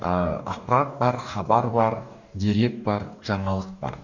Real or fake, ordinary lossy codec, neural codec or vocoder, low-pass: fake; none; codec, 44.1 kHz, 2.6 kbps, DAC; 7.2 kHz